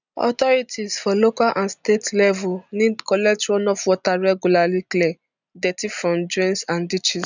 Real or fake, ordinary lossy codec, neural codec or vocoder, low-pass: real; none; none; 7.2 kHz